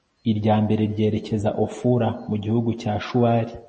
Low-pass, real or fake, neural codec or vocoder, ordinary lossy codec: 9.9 kHz; real; none; MP3, 32 kbps